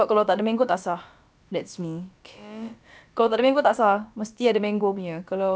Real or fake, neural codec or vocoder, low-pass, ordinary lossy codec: fake; codec, 16 kHz, about 1 kbps, DyCAST, with the encoder's durations; none; none